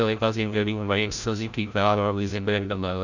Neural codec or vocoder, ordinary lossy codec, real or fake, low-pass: codec, 16 kHz, 0.5 kbps, FreqCodec, larger model; none; fake; 7.2 kHz